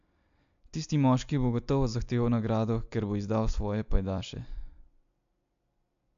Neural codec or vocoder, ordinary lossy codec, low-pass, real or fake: none; AAC, 64 kbps; 7.2 kHz; real